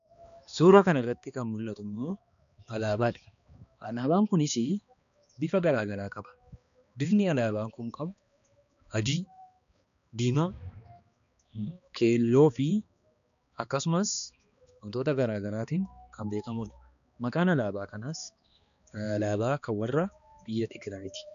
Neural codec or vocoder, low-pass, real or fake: codec, 16 kHz, 2 kbps, X-Codec, HuBERT features, trained on balanced general audio; 7.2 kHz; fake